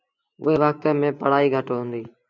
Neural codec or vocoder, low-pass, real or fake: vocoder, 24 kHz, 100 mel bands, Vocos; 7.2 kHz; fake